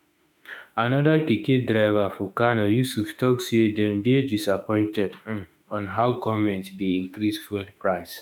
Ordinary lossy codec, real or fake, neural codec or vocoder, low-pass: none; fake; autoencoder, 48 kHz, 32 numbers a frame, DAC-VAE, trained on Japanese speech; 19.8 kHz